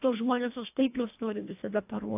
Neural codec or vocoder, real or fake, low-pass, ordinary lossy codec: codec, 24 kHz, 1.5 kbps, HILCodec; fake; 3.6 kHz; AAC, 32 kbps